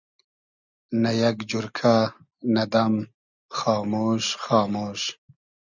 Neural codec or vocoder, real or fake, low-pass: none; real; 7.2 kHz